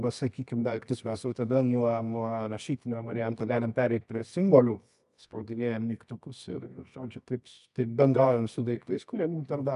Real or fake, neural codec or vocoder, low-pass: fake; codec, 24 kHz, 0.9 kbps, WavTokenizer, medium music audio release; 10.8 kHz